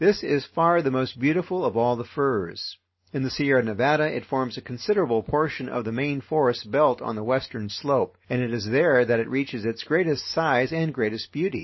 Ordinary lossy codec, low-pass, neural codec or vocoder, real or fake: MP3, 24 kbps; 7.2 kHz; none; real